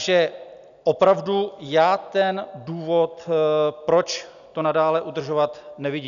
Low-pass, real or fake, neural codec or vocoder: 7.2 kHz; real; none